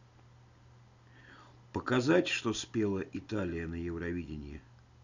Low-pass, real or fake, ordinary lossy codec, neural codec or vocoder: 7.2 kHz; real; none; none